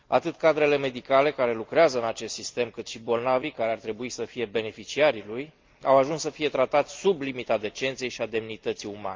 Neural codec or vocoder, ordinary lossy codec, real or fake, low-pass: none; Opus, 24 kbps; real; 7.2 kHz